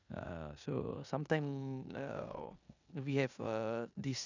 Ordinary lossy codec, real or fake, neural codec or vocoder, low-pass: none; fake; codec, 16 kHz in and 24 kHz out, 0.9 kbps, LongCat-Audio-Codec, four codebook decoder; 7.2 kHz